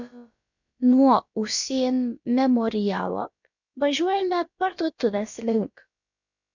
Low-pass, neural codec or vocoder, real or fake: 7.2 kHz; codec, 16 kHz, about 1 kbps, DyCAST, with the encoder's durations; fake